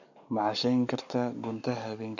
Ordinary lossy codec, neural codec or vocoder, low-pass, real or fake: none; codec, 44.1 kHz, 7.8 kbps, Pupu-Codec; 7.2 kHz; fake